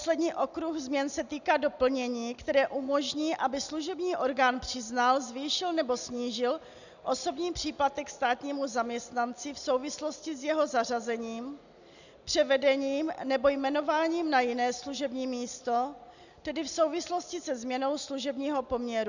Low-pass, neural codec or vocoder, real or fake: 7.2 kHz; none; real